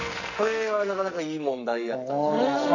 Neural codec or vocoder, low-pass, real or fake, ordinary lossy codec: codec, 44.1 kHz, 2.6 kbps, SNAC; 7.2 kHz; fake; none